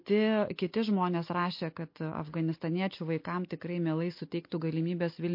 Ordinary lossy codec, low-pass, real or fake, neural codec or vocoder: MP3, 32 kbps; 5.4 kHz; real; none